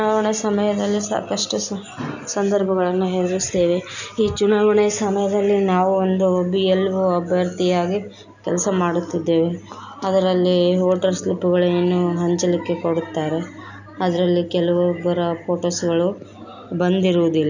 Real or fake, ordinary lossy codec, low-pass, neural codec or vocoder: real; none; 7.2 kHz; none